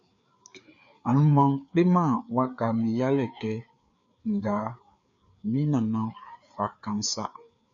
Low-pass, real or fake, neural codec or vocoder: 7.2 kHz; fake; codec, 16 kHz, 4 kbps, FreqCodec, larger model